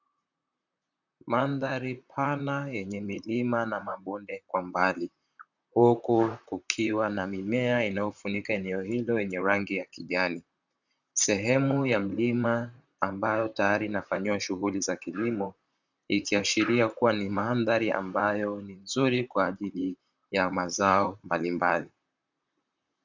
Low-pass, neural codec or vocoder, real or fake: 7.2 kHz; vocoder, 44.1 kHz, 128 mel bands, Pupu-Vocoder; fake